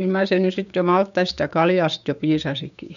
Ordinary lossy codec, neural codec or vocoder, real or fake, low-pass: none; codec, 16 kHz, 6 kbps, DAC; fake; 7.2 kHz